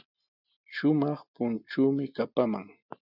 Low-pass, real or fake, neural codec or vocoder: 5.4 kHz; real; none